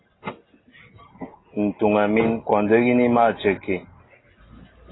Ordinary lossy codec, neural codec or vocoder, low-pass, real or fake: AAC, 16 kbps; none; 7.2 kHz; real